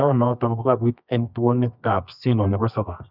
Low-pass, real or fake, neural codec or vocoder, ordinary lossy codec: 5.4 kHz; fake; codec, 24 kHz, 0.9 kbps, WavTokenizer, medium music audio release; none